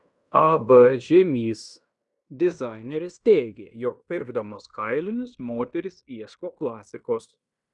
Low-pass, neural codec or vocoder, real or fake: 10.8 kHz; codec, 16 kHz in and 24 kHz out, 0.9 kbps, LongCat-Audio-Codec, fine tuned four codebook decoder; fake